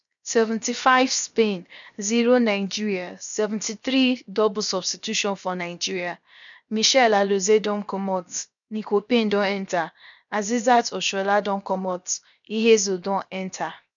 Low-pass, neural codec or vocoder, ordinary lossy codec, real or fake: 7.2 kHz; codec, 16 kHz, 0.7 kbps, FocalCodec; none; fake